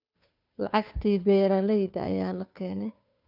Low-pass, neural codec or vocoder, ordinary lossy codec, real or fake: 5.4 kHz; codec, 16 kHz, 2 kbps, FunCodec, trained on Chinese and English, 25 frames a second; none; fake